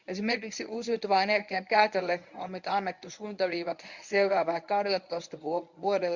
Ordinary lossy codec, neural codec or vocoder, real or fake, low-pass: none; codec, 24 kHz, 0.9 kbps, WavTokenizer, medium speech release version 1; fake; 7.2 kHz